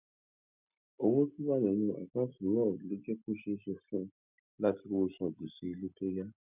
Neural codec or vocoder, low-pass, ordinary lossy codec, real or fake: none; 3.6 kHz; none; real